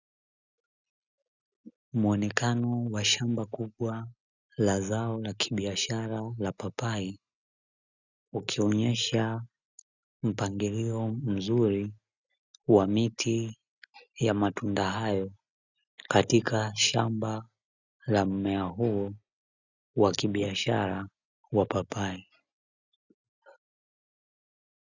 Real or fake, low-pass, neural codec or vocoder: real; 7.2 kHz; none